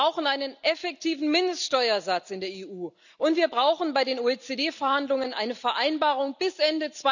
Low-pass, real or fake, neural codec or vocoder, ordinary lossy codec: 7.2 kHz; real; none; none